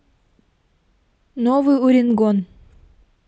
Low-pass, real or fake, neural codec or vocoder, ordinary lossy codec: none; real; none; none